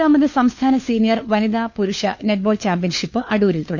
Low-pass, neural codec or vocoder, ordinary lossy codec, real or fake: 7.2 kHz; codec, 16 kHz, 4 kbps, FunCodec, trained on LibriTTS, 50 frames a second; none; fake